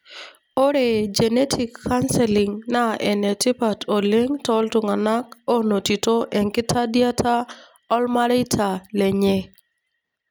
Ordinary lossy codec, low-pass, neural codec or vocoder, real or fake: none; none; none; real